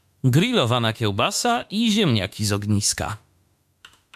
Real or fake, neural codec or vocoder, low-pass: fake; autoencoder, 48 kHz, 32 numbers a frame, DAC-VAE, trained on Japanese speech; 14.4 kHz